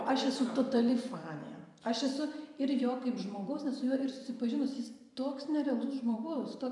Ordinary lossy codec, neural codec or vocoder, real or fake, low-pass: MP3, 96 kbps; vocoder, 44.1 kHz, 128 mel bands every 512 samples, BigVGAN v2; fake; 10.8 kHz